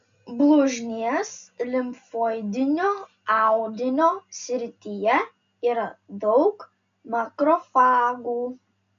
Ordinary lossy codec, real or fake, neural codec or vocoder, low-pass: AAC, 64 kbps; real; none; 7.2 kHz